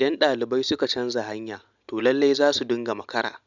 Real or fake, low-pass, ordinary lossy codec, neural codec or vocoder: real; 7.2 kHz; none; none